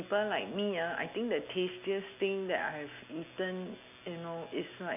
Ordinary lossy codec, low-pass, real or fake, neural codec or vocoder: none; 3.6 kHz; real; none